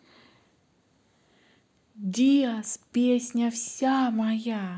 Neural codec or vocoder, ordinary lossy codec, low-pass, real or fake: none; none; none; real